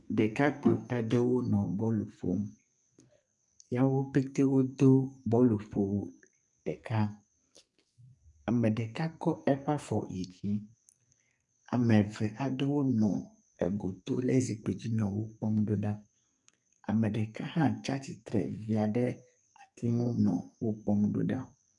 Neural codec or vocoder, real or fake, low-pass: codec, 44.1 kHz, 2.6 kbps, SNAC; fake; 10.8 kHz